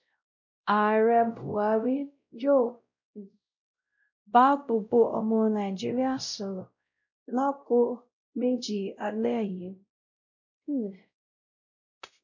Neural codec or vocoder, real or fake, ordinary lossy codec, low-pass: codec, 16 kHz, 0.5 kbps, X-Codec, WavLM features, trained on Multilingual LibriSpeech; fake; none; 7.2 kHz